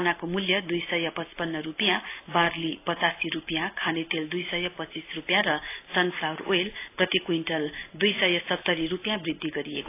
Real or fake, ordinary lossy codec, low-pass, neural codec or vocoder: real; AAC, 24 kbps; 3.6 kHz; none